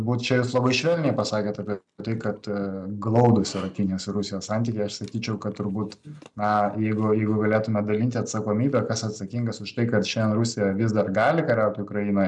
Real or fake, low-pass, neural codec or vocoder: real; 10.8 kHz; none